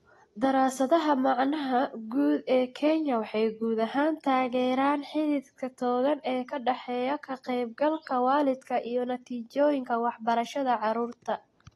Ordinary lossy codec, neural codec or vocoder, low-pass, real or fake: AAC, 32 kbps; none; 19.8 kHz; real